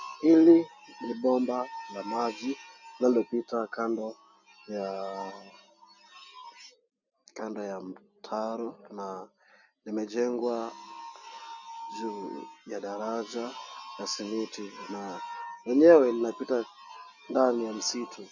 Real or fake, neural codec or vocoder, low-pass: real; none; 7.2 kHz